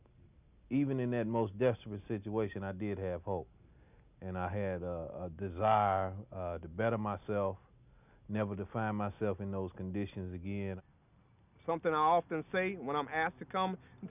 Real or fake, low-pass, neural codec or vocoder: real; 3.6 kHz; none